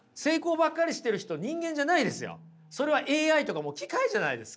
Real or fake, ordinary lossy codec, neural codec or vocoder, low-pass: real; none; none; none